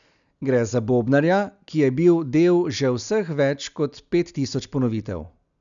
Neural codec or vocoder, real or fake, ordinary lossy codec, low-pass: none; real; none; 7.2 kHz